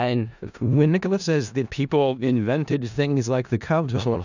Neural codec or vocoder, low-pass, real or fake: codec, 16 kHz in and 24 kHz out, 0.4 kbps, LongCat-Audio-Codec, four codebook decoder; 7.2 kHz; fake